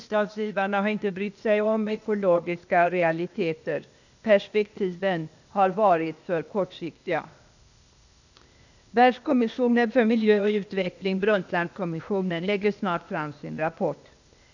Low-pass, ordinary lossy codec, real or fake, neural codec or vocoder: 7.2 kHz; none; fake; codec, 16 kHz, 0.8 kbps, ZipCodec